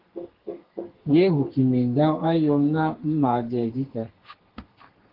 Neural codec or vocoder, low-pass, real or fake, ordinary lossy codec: codec, 32 kHz, 1.9 kbps, SNAC; 5.4 kHz; fake; Opus, 16 kbps